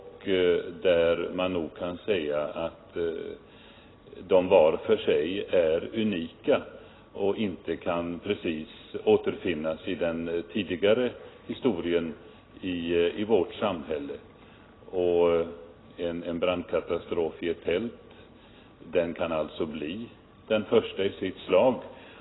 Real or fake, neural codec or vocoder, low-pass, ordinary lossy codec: real; none; 7.2 kHz; AAC, 16 kbps